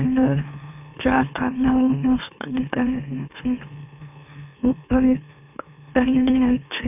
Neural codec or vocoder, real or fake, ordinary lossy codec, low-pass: autoencoder, 44.1 kHz, a latent of 192 numbers a frame, MeloTTS; fake; none; 3.6 kHz